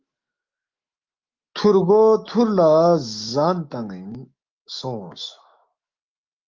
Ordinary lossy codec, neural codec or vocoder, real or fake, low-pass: Opus, 32 kbps; autoencoder, 48 kHz, 128 numbers a frame, DAC-VAE, trained on Japanese speech; fake; 7.2 kHz